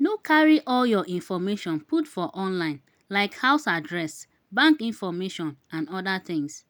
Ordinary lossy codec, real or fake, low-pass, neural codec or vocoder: none; real; none; none